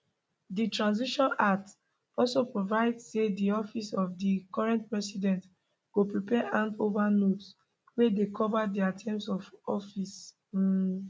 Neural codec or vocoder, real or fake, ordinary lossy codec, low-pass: none; real; none; none